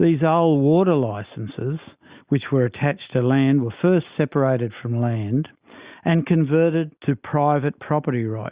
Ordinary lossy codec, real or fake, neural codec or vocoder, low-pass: Opus, 64 kbps; real; none; 3.6 kHz